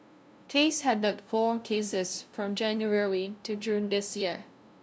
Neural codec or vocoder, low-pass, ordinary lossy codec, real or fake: codec, 16 kHz, 0.5 kbps, FunCodec, trained on LibriTTS, 25 frames a second; none; none; fake